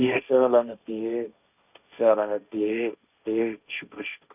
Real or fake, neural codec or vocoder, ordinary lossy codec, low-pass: fake; codec, 16 kHz, 1.1 kbps, Voila-Tokenizer; none; 3.6 kHz